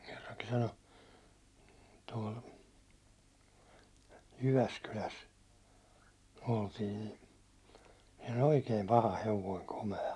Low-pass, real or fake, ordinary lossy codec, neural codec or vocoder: none; real; none; none